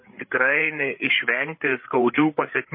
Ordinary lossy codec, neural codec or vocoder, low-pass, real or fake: MP3, 24 kbps; codec, 16 kHz, 8 kbps, FreqCodec, larger model; 5.4 kHz; fake